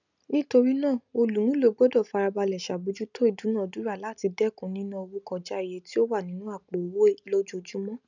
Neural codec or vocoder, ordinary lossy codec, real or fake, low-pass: none; none; real; 7.2 kHz